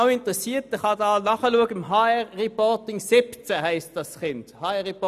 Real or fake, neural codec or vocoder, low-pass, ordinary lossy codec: real; none; 14.4 kHz; none